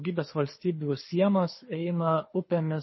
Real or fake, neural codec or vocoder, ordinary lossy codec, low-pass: fake; codec, 24 kHz, 6 kbps, HILCodec; MP3, 24 kbps; 7.2 kHz